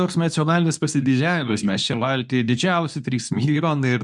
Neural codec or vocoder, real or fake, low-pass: codec, 24 kHz, 0.9 kbps, WavTokenizer, medium speech release version 2; fake; 10.8 kHz